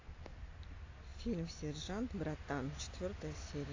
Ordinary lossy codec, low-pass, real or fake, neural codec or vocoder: AAC, 32 kbps; 7.2 kHz; real; none